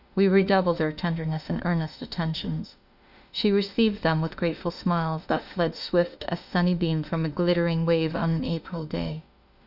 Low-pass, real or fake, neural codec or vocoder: 5.4 kHz; fake; autoencoder, 48 kHz, 32 numbers a frame, DAC-VAE, trained on Japanese speech